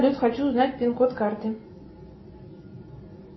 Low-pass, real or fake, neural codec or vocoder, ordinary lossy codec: 7.2 kHz; real; none; MP3, 24 kbps